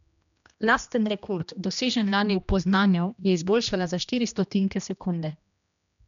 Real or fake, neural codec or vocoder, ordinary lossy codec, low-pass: fake; codec, 16 kHz, 1 kbps, X-Codec, HuBERT features, trained on general audio; none; 7.2 kHz